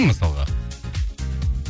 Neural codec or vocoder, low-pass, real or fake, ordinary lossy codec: none; none; real; none